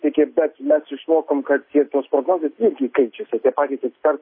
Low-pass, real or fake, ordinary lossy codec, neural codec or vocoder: 5.4 kHz; real; MP3, 24 kbps; none